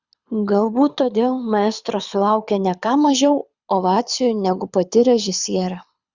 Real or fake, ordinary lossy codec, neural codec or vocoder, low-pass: fake; Opus, 64 kbps; codec, 24 kHz, 6 kbps, HILCodec; 7.2 kHz